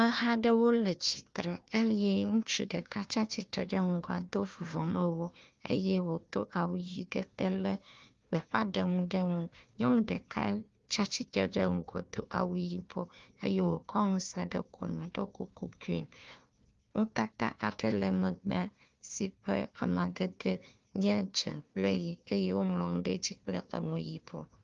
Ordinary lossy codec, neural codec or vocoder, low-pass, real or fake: Opus, 32 kbps; codec, 16 kHz, 1 kbps, FunCodec, trained on Chinese and English, 50 frames a second; 7.2 kHz; fake